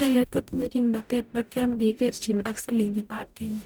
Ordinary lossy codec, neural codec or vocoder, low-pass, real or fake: none; codec, 44.1 kHz, 0.9 kbps, DAC; none; fake